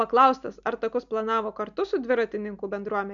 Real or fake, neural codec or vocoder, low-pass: real; none; 7.2 kHz